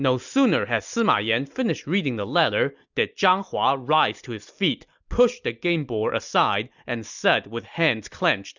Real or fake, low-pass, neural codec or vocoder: real; 7.2 kHz; none